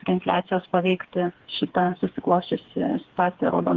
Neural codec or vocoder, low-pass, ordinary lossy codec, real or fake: codec, 16 kHz, 4 kbps, FreqCodec, smaller model; 7.2 kHz; Opus, 16 kbps; fake